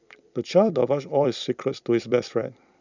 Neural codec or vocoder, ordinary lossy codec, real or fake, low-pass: vocoder, 44.1 kHz, 128 mel bands every 256 samples, BigVGAN v2; none; fake; 7.2 kHz